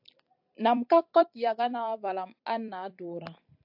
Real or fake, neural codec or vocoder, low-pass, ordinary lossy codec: fake; vocoder, 44.1 kHz, 128 mel bands every 256 samples, BigVGAN v2; 5.4 kHz; AAC, 48 kbps